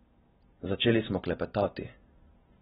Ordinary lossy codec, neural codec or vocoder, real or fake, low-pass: AAC, 16 kbps; none; real; 7.2 kHz